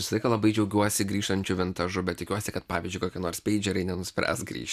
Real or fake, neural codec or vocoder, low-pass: real; none; 14.4 kHz